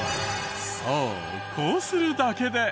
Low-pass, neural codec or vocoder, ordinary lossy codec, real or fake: none; none; none; real